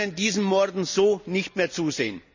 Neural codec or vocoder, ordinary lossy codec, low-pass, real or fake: none; none; 7.2 kHz; real